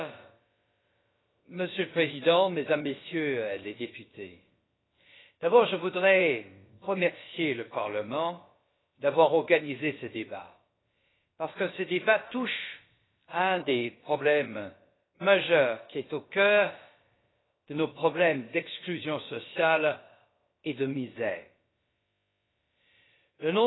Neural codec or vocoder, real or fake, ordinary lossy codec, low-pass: codec, 16 kHz, about 1 kbps, DyCAST, with the encoder's durations; fake; AAC, 16 kbps; 7.2 kHz